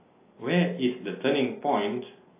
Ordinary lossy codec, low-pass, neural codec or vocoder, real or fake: AAC, 24 kbps; 3.6 kHz; none; real